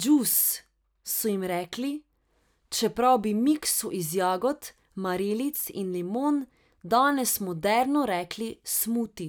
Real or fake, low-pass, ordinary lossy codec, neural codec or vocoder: real; none; none; none